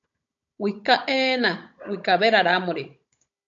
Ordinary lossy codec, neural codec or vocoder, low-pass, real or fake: AAC, 64 kbps; codec, 16 kHz, 16 kbps, FunCodec, trained on Chinese and English, 50 frames a second; 7.2 kHz; fake